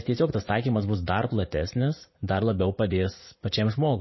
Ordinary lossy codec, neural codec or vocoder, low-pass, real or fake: MP3, 24 kbps; vocoder, 44.1 kHz, 80 mel bands, Vocos; 7.2 kHz; fake